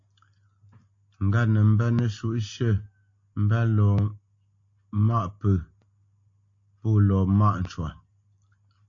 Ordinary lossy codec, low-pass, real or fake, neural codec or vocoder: AAC, 48 kbps; 7.2 kHz; real; none